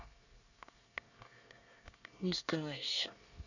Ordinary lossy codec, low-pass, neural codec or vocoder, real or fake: none; 7.2 kHz; codec, 24 kHz, 1 kbps, SNAC; fake